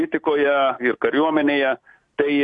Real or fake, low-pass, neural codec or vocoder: real; 10.8 kHz; none